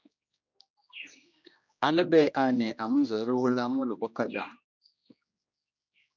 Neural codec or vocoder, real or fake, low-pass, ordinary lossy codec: codec, 16 kHz, 1 kbps, X-Codec, HuBERT features, trained on general audio; fake; 7.2 kHz; MP3, 64 kbps